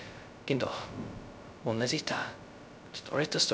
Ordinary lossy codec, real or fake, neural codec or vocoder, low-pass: none; fake; codec, 16 kHz, 0.2 kbps, FocalCodec; none